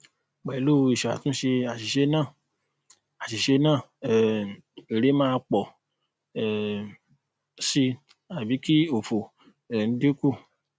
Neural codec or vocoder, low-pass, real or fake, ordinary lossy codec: none; none; real; none